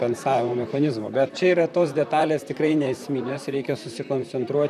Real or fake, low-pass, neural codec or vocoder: fake; 14.4 kHz; vocoder, 44.1 kHz, 128 mel bands, Pupu-Vocoder